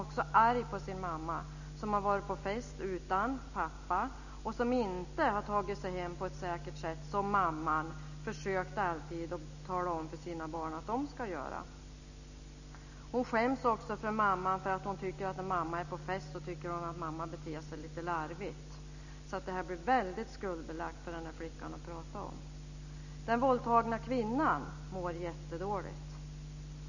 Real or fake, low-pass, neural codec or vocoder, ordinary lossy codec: real; 7.2 kHz; none; none